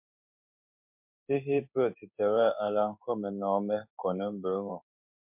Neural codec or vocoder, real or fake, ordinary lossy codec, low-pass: codec, 16 kHz in and 24 kHz out, 1 kbps, XY-Tokenizer; fake; MP3, 32 kbps; 3.6 kHz